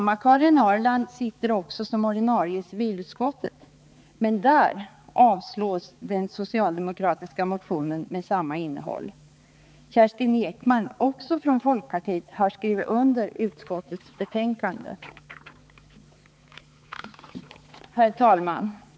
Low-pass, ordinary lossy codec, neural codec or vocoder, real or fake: none; none; codec, 16 kHz, 4 kbps, X-Codec, HuBERT features, trained on balanced general audio; fake